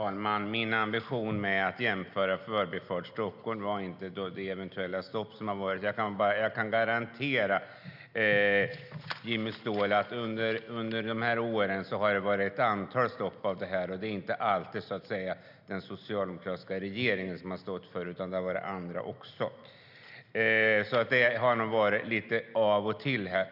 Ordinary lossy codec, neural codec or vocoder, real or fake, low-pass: none; none; real; 5.4 kHz